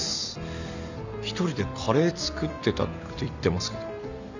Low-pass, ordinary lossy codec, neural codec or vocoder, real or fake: 7.2 kHz; none; none; real